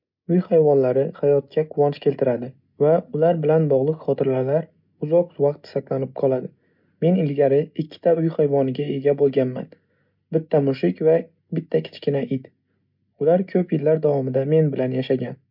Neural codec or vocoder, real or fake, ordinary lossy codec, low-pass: none; real; MP3, 48 kbps; 5.4 kHz